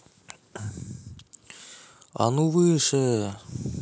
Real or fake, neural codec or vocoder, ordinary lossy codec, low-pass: real; none; none; none